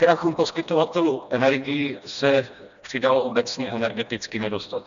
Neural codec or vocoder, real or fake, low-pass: codec, 16 kHz, 1 kbps, FreqCodec, smaller model; fake; 7.2 kHz